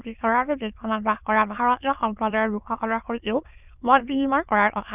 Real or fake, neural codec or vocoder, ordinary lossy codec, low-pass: fake; autoencoder, 22.05 kHz, a latent of 192 numbers a frame, VITS, trained on many speakers; none; 3.6 kHz